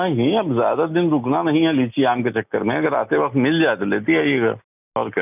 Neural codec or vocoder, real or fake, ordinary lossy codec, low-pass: none; real; none; 3.6 kHz